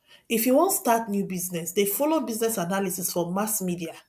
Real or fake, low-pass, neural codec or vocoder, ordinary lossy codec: real; 14.4 kHz; none; none